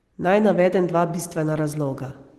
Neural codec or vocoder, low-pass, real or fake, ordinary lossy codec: none; 14.4 kHz; real; Opus, 16 kbps